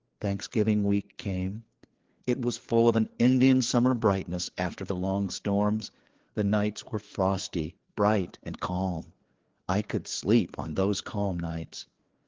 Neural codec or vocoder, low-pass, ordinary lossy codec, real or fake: codec, 16 kHz, 4 kbps, FreqCodec, larger model; 7.2 kHz; Opus, 16 kbps; fake